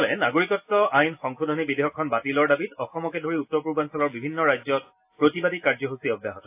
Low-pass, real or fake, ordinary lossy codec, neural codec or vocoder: 3.6 kHz; real; AAC, 32 kbps; none